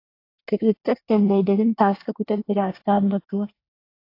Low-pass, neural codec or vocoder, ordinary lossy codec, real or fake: 5.4 kHz; codec, 24 kHz, 1 kbps, SNAC; AAC, 32 kbps; fake